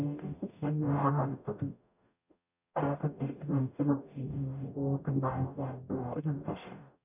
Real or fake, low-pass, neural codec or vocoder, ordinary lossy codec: fake; 3.6 kHz; codec, 44.1 kHz, 0.9 kbps, DAC; none